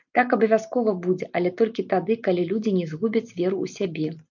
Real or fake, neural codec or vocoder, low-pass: real; none; 7.2 kHz